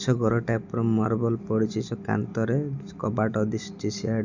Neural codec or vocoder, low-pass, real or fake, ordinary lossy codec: none; 7.2 kHz; real; none